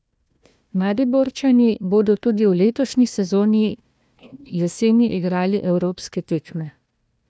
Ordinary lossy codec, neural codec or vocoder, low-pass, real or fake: none; codec, 16 kHz, 1 kbps, FunCodec, trained on Chinese and English, 50 frames a second; none; fake